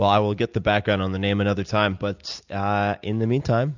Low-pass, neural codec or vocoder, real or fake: 7.2 kHz; none; real